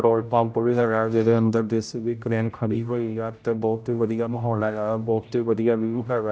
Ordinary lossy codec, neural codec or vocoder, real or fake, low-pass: none; codec, 16 kHz, 0.5 kbps, X-Codec, HuBERT features, trained on general audio; fake; none